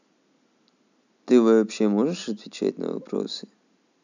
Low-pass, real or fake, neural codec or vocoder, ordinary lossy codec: 7.2 kHz; real; none; none